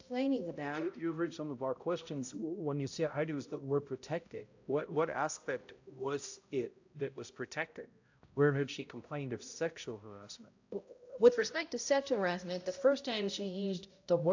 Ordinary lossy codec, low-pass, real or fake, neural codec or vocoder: AAC, 48 kbps; 7.2 kHz; fake; codec, 16 kHz, 0.5 kbps, X-Codec, HuBERT features, trained on balanced general audio